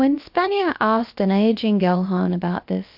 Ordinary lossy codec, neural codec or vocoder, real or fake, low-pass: MP3, 48 kbps; codec, 16 kHz, about 1 kbps, DyCAST, with the encoder's durations; fake; 5.4 kHz